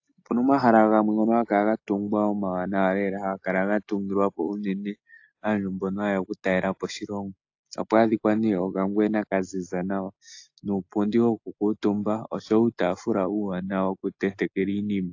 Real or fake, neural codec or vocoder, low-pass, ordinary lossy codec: real; none; 7.2 kHz; AAC, 48 kbps